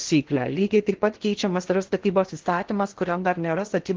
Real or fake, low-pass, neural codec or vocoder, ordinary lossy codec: fake; 7.2 kHz; codec, 16 kHz in and 24 kHz out, 0.8 kbps, FocalCodec, streaming, 65536 codes; Opus, 16 kbps